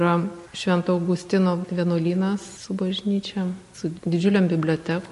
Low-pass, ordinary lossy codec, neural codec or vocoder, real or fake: 14.4 kHz; MP3, 48 kbps; none; real